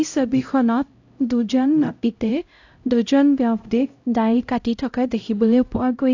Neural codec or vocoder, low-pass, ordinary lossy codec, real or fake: codec, 16 kHz, 0.5 kbps, X-Codec, HuBERT features, trained on LibriSpeech; 7.2 kHz; AAC, 48 kbps; fake